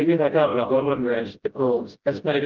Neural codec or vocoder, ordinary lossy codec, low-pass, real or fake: codec, 16 kHz, 0.5 kbps, FreqCodec, smaller model; Opus, 32 kbps; 7.2 kHz; fake